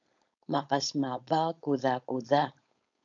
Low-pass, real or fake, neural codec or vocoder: 7.2 kHz; fake; codec, 16 kHz, 4.8 kbps, FACodec